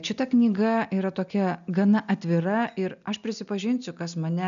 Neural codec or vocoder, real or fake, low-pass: none; real; 7.2 kHz